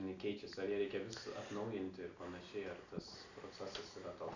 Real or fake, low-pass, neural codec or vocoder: real; 7.2 kHz; none